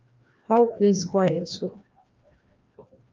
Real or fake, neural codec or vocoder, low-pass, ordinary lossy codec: fake; codec, 16 kHz, 1 kbps, FreqCodec, larger model; 7.2 kHz; Opus, 24 kbps